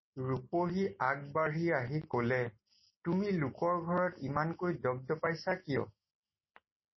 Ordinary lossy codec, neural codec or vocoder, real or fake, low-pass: MP3, 24 kbps; none; real; 7.2 kHz